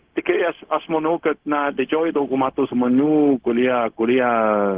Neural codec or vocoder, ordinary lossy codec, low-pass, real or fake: codec, 16 kHz, 0.4 kbps, LongCat-Audio-Codec; Opus, 16 kbps; 3.6 kHz; fake